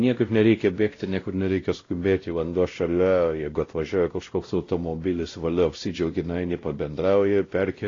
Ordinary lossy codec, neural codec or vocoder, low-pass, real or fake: AAC, 32 kbps; codec, 16 kHz, 0.5 kbps, X-Codec, WavLM features, trained on Multilingual LibriSpeech; 7.2 kHz; fake